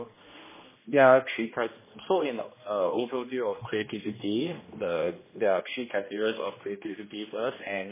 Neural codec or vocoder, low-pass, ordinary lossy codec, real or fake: codec, 16 kHz, 1 kbps, X-Codec, HuBERT features, trained on general audio; 3.6 kHz; MP3, 16 kbps; fake